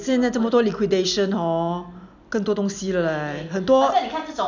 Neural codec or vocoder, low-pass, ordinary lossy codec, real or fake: none; 7.2 kHz; none; real